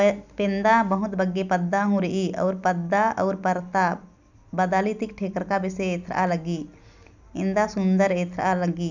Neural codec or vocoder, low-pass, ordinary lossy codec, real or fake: none; 7.2 kHz; none; real